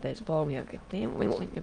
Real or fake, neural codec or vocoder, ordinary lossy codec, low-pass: fake; autoencoder, 22.05 kHz, a latent of 192 numbers a frame, VITS, trained on many speakers; Opus, 32 kbps; 9.9 kHz